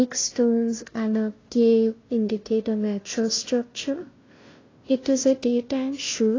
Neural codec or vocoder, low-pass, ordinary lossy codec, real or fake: codec, 16 kHz, 0.5 kbps, FunCodec, trained on Chinese and English, 25 frames a second; 7.2 kHz; AAC, 32 kbps; fake